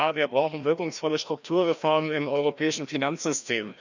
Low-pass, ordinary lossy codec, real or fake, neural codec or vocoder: 7.2 kHz; none; fake; codec, 16 kHz, 1 kbps, FreqCodec, larger model